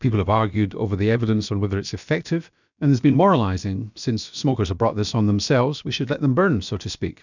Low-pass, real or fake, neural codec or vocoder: 7.2 kHz; fake; codec, 16 kHz, about 1 kbps, DyCAST, with the encoder's durations